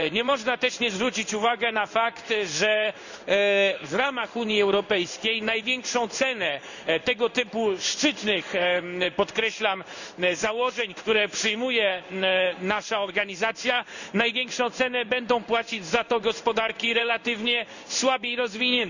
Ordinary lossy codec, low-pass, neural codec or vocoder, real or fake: none; 7.2 kHz; codec, 16 kHz in and 24 kHz out, 1 kbps, XY-Tokenizer; fake